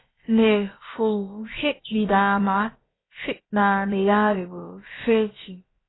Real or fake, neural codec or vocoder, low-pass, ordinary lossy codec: fake; codec, 16 kHz, about 1 kbps, DyCAST, with the encoder's durations; 7.2 kHz; AAC, 16 kbps